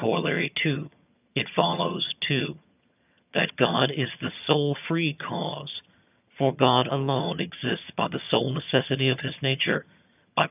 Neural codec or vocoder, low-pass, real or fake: vocoder, 22.05 kHz, 80 mel bands, HiFi-GAN; 3.6 kHz; fake